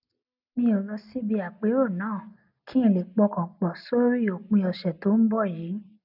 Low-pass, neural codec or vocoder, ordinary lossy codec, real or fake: 5.4 kHz; none; none; real